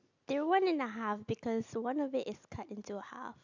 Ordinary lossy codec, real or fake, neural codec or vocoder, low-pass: none; real; none; 7.2 kHz